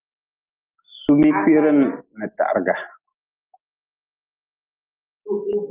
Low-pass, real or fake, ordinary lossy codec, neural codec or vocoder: 3.6 kHz; real; Opus, 24 kbps; none